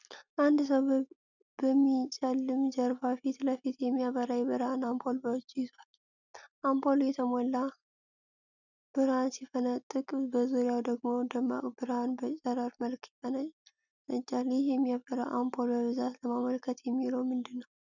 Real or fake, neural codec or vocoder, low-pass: real; none; 7.2 kHz